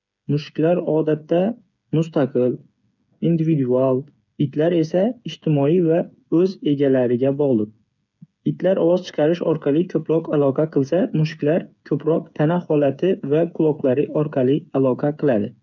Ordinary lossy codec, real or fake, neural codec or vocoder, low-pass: none; fake; codec, 16 kHz, 8 kbps, FreqCodec, smaller model; 7.2 kHz